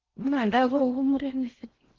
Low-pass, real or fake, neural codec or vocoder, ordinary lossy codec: 7.2 kHz; fake; codec, 16 kHz in and 24 kHz out, 0.6 kbps, FocalCodec, streaming, 4096 codes; Opus, 16 kbps